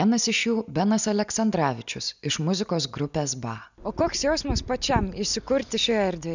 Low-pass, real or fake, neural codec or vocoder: 7.2 kHz; real; none